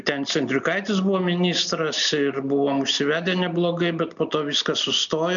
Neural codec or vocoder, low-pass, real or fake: none; 7.2 kHz; real